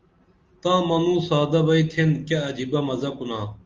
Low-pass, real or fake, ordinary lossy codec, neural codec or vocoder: 7.2 kHz; real; Opus, 32 kbps; none